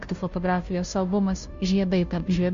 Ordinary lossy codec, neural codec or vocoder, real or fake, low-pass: MP3, 48 kbps; codec, 16 kHz, 0.5 kbps, FunCodec, trained on Chinese and English, 25 frames a second; fake; 7.2 kHz